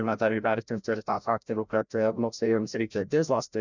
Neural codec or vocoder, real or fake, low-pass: codec, 16 kHz, 0.5 kbps, FreqCodec, larger model; fake; 7.2 kHz